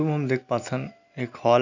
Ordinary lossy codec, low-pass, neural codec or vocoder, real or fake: none; 7.2 kHz; none; real